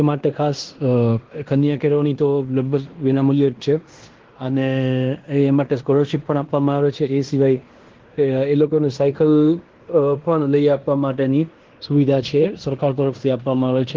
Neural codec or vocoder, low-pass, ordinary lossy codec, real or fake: codec, 16 kHz in and 24 kHz out, 0.9 kbps, LongCat-Audio-Codec, fine tuned four codebook decoder; 7.2 kHz; Opus, 16 kbps; fake